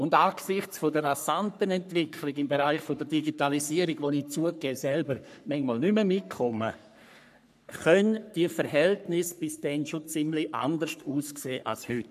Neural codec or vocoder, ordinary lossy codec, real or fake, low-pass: codec, 44.1 kHz, 3.4 kbps, Pupu-Codec; none; fake; 14.4 kHz